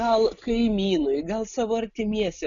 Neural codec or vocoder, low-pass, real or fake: none; 7.2 kHz; real